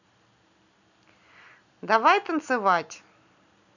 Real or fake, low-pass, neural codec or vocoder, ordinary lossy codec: real; 7.2 kHz; none; none